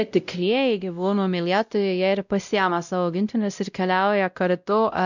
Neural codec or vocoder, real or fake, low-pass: codec, 16 kHz, 0.5 kbps, X-Codec, WavLM features, trained on Multilingual LibriSpeech; fake; 7.2 kHz